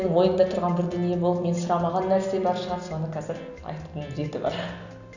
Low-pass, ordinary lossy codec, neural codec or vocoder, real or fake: 7.2 kHz; none; none; real